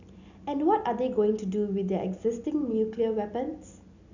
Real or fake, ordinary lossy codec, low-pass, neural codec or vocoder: real; none; 7.2 kHz; none